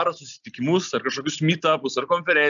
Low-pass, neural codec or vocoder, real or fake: 7.2 kHz; none; real